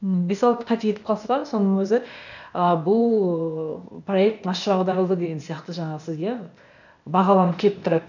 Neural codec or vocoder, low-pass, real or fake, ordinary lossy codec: codec, 16 kHz, 0.8 kbps, ZipCodec; 7.2 kHz; fake; none